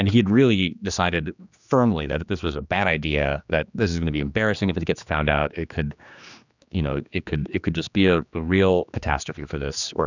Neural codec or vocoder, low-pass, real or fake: codec, 16 kHz, 2 kbps, X-Codec, HuBERT features, trained on general audio; 7.2 kHz; fake